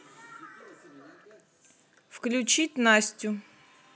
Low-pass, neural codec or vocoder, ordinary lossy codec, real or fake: none; none; none; real